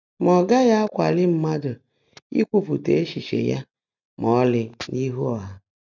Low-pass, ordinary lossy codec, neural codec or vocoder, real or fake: 7.2 kHz; none; none; real